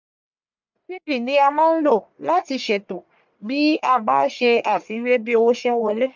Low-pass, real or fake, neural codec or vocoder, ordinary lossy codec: 7.2 kHz; fake; codec, 44.1 kHz, 1.7 kbps, Pupu-Codec; MP3, 64 kbps